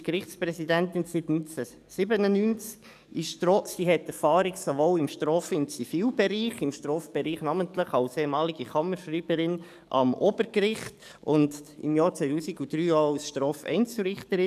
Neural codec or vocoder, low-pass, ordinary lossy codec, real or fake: codec, 44.1 kHz, 7.8 kbps, DAC; 14.4 kHz; none; fake